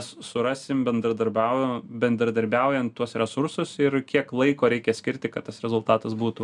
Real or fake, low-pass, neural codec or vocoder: real; 10.8 kHz; none